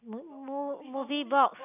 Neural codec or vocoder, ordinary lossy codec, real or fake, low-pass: none; none; real; 3.6 kHz